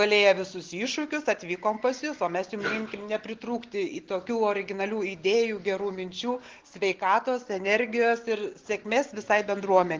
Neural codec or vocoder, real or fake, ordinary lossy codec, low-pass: none; real; Opus, 16 kbps; 7.2 kHz